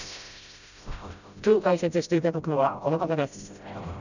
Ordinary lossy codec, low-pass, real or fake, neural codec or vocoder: none; 7.2 kHz; fake; codec, 16 kHz, 0.5 kbps, FreqCodec, smaller model